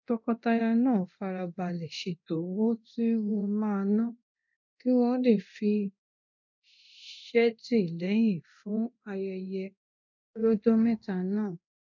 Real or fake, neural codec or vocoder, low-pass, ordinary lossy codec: fake; codec, 24 kHz, 0.9 kbps, DualCodec; 7.2 kHz; none